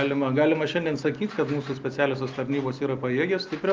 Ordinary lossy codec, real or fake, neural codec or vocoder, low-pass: Opus, 32 kbps; real; none; 7.2 kHz